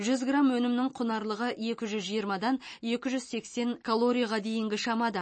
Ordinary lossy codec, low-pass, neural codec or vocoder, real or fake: MP3, 32 kbps; 9.9 kHz; none; real